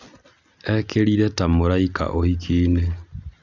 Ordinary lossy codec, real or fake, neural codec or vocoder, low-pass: Opus, 64 kbps; real; none; 7.2 kHz